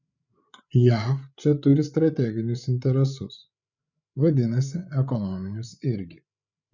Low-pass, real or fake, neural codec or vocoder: 7.2 kHz; fake; codec, 16 kHz, 8 kbps, FreqCodec, larger model